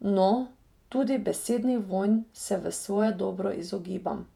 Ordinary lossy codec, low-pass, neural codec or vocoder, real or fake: none; 19.8 kHz; vocoder, 48 kHz, 128 mel bands, Vocos; fake